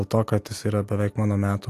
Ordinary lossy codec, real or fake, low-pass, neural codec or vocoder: AAC, 64 kbps; real; 14.4 kHz; none